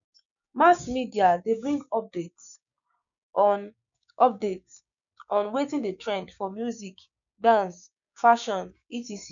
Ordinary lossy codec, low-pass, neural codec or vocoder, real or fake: none; 7.2 kHz; codec, 16 kHz, 6 kbps, DAC; fake